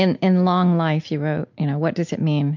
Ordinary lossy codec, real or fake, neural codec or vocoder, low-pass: MP3, 48 kbps; real; none; 7.2 kHz